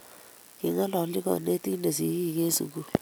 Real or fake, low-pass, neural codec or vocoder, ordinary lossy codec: real; none; none; none